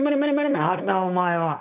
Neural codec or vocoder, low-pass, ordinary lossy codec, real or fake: codec, 16 kHz, 4.8 kbps, FACodec; 3.6 kHz; none; fake